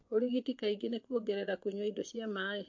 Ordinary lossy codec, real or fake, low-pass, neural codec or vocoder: MP3, 48 kbps; fake; 7.2 kHz; vocoder, 24 kHz, 100 mel bands, Vocos